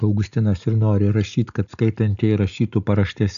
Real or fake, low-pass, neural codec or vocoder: real; 7.2 kHz; none